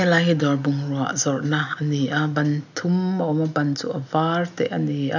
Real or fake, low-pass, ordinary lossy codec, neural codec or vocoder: real; 7.2 kHz; none; none